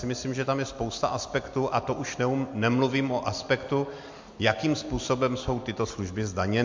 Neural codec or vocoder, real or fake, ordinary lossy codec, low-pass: none; real; MP3, 48 kbps; 7.2 kHz